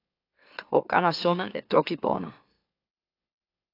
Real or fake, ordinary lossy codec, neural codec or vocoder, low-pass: fake; AAC, 32 kbps; autoencoder, 44.1 kHz, a latent of 192 numbers a frame, MeloTTS; 5.4 kHz